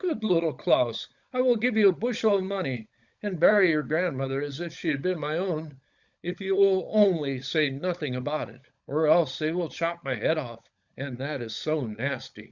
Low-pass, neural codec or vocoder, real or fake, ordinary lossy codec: 7.2 kHz; codec, 16 kHz, 16 kbps, FunCodec, trained on LibriTTS, 50 frames a second; fake; Opus, 64 kbps